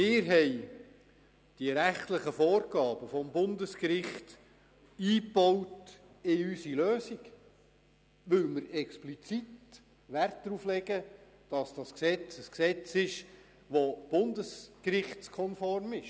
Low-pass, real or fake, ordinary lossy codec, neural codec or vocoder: none; real; none; none